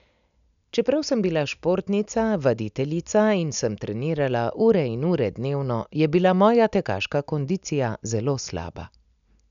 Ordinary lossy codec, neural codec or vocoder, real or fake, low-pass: none; none; real; 7.2 kHz